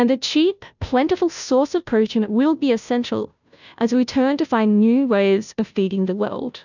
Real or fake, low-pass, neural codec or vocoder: fake; 7.2 kHz; codec, 16 kHz, 0.5 kbps, FunCodec, trained on Chinese and English, 25 frames a second